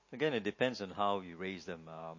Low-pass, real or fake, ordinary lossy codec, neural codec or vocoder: 7.2 kHz; real; MP3, 32 kbps; none